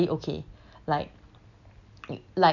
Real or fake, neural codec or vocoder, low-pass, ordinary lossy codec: real; none; 7.2 kHz; none